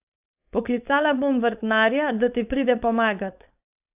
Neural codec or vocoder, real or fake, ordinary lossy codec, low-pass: codec, 16 kHz, 4.8 kbps, FACodec; fake; AAC, 32 kbps; 3.6 kHz